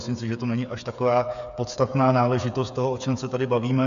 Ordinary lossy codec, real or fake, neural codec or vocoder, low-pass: MP3, 96 kbps; fake; codec, 16 kHz, 8 kbps, FreqCodec, smaller model; 7.2 kHz